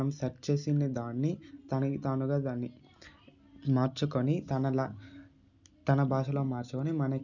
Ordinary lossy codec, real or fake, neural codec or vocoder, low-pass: none; real; none; 7.2 kHz